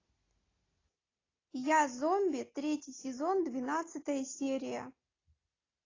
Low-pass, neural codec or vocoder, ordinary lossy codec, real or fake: 7.2 kHz; none; AAC, 32 kbps; real